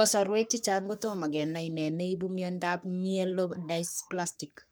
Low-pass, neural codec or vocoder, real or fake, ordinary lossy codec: none; codec, 44.1 kHz, 3.4 kbps, Pupu-Codec; fake; none